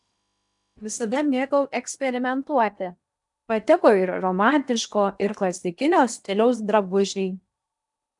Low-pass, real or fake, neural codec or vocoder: 10.8 kHz; fake; codec, 16 kHz in and 24 kHz out, 0.8 kbps, FocalCodec, streaming, 65536 codes